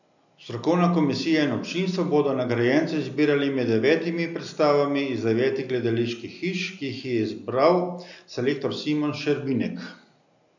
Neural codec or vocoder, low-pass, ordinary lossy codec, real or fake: none; 7.2 kHz; none; real